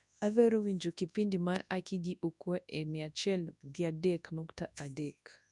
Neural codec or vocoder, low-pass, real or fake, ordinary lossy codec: codec, 24 kHz, 0.9 kbps, WavTokenizer, large speech release; 10.8 kHz; fake; none